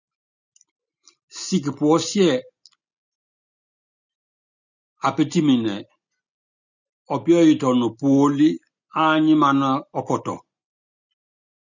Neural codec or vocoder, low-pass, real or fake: none; 7.2 kHz; real